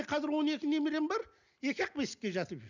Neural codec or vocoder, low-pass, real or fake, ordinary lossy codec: none; 7.2 kHz; real; none